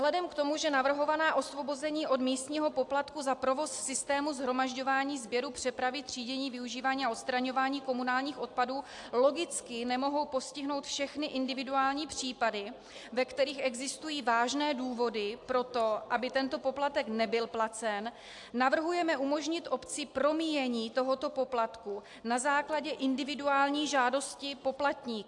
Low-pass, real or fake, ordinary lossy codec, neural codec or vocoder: 10.8 kHz; real; AAC, 64 kbps; none